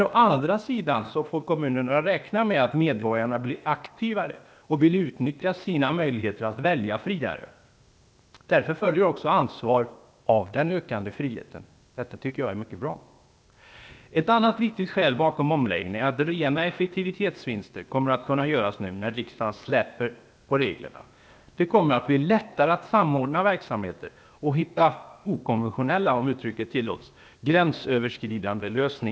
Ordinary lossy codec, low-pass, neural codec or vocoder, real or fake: none; none; codec, 16 kHz, 0.8 kbps, ZipCodec; fake